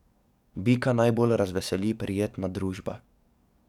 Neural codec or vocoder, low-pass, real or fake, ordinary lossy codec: codec, 44.1 kHz, 7.8 kbps, DAC; 19.8 kHz; fake; none